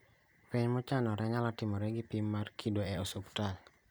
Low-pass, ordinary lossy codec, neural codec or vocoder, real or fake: none; none; none; real